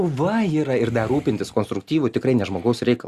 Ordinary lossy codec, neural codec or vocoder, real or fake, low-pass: Opus, 64 kbps; none; real; 14.4 kHz